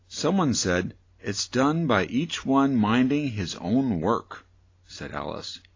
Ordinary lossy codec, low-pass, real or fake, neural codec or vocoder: AAC, 32 kbps; 7.2 kHz; real; none